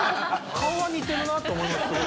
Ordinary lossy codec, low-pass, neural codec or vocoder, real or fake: none; none; none; real